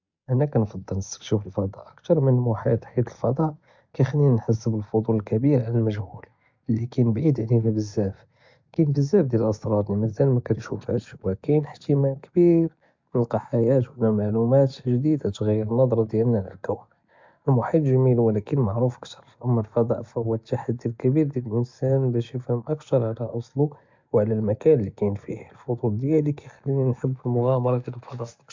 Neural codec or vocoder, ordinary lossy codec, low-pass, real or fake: none; MP3, 64 kbps; 7.2 kHz; real